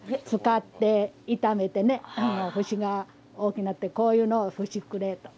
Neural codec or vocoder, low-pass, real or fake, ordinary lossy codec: none; none; real; none